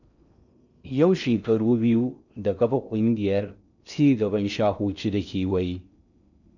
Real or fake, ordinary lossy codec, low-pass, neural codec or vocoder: fake; none; 7.2 kHz; codec, 16 kHz in and 24 kHz out, 0.6 kbps, FocalCodec, streaming, 4096 codes